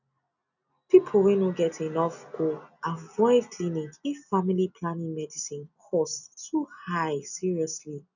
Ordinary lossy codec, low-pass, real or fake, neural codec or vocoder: none; 7.2 kHz; real; none